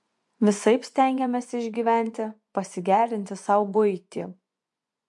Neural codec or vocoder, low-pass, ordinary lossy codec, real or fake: vocoder, 24 kHz, 100 mel bands, Vocos; 10.8 kHz; MP3, 64 kbps; fake